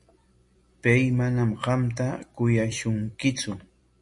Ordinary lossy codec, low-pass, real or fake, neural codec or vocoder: MP3, 48 kbps; 10.8 kHz; fake; vocoder, 44.1 kHz, 128 mel bands every 256 samples, BigVGAN v2